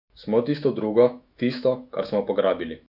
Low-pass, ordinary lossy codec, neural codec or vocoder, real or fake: 5.4 kHz; none; autoencoder, 48 kHz, 128 numbers a frame, DAC-VAE, trained on Japanese speech; fake